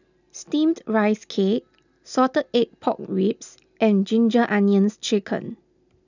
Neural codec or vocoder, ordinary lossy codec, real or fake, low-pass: none; none; real; 7.2 kHz